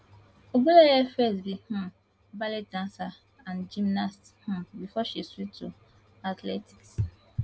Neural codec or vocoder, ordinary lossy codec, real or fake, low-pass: none; none; real; none